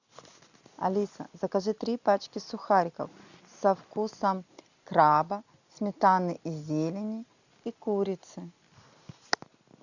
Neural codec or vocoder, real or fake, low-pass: none; real; 7.2 kHz